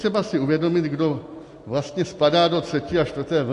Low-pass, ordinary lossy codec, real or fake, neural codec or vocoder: 10.8 kHz; AAC, 48 kbps; real; none